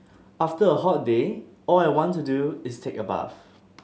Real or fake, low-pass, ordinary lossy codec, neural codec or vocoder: real; none; none; none